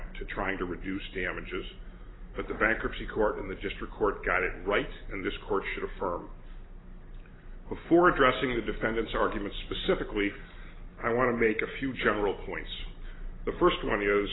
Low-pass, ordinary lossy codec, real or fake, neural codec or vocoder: 7.2 kHz; AAC, 16 kbps; real; none